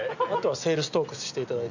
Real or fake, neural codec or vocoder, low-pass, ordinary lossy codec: real; none; 7.2 kHz; none